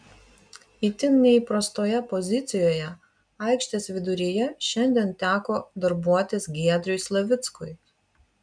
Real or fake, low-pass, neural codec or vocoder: real; 9.9 kHz; none